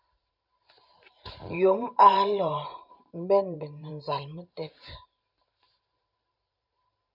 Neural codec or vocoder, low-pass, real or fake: vocoder, 44.1 kHz, 128 mel bands every 512 samples, BigVGAN v2; 5.4 kHz; fake